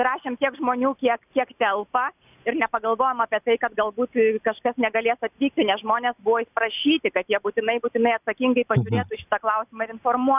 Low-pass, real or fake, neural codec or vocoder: 3.6 kHz; real; none